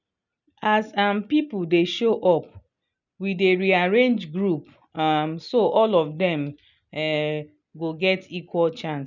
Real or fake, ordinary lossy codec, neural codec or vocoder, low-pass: real; none; none; 7.2 kHz